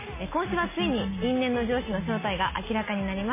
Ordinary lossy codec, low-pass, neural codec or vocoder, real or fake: MP3, 16 kbps; 3.6 kHz; none; real